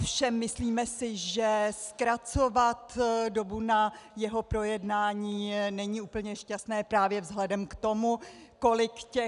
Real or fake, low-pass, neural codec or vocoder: real; 10.8 kHz; none